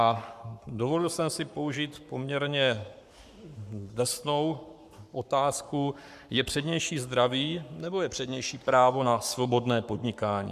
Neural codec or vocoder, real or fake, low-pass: codec, 44.1 kHz, 7.8 kbps, Pupu-Codec; fake; 14.4 kHz